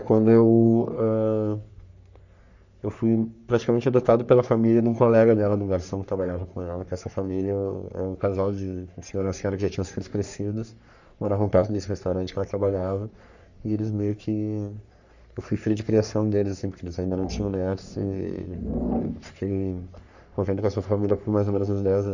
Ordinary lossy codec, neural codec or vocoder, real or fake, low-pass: none; codec, 44.1 kHz, 3.4 kbps, Pupu-Codec; fake; 7.2 kHz